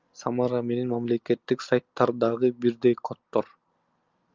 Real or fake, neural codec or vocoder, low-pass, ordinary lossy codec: real; none; 7.2 kHz; Opus, 24 kbps